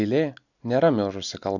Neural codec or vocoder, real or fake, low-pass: none; real; 7.2 kHz